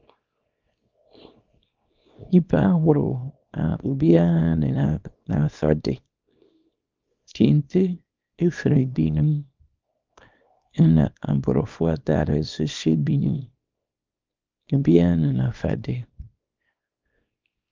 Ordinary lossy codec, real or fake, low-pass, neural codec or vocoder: Opus, 32 kbps; fake; 7.2 kHz; codec, 24 kHz, 0.9 kbps, WavTokenizer, small release